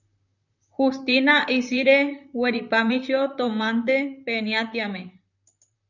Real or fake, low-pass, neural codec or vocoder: fake; 7.2 kHz; vocoder, 44.1 kHz, 128 mel bands, Pupu-Vocoder